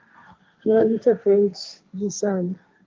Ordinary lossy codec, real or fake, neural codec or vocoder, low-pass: Opus, 24 kbps; fake; codec, 16 kHz, 1.1 kbps, Voila-Tokenizer; 7.2 kHz